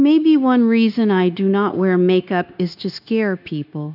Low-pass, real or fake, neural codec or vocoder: 5.4 kHz; fake; codec, 16 kHz, 0.9 kbps, LongCat-Audio-Codec